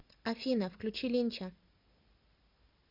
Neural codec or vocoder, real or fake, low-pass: none; real; 5.4 kHz